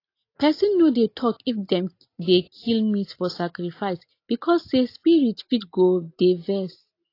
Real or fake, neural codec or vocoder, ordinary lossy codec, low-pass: real; none; AAC, 32 kbps; 5.4 kHz